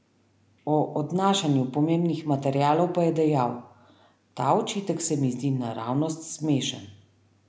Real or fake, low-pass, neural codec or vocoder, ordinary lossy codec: real; none; none; none